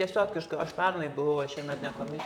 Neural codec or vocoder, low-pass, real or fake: vocoder, 44.1 kHz, 128 mel bands, Pupu-Vocoder; 19.8 kHz; fake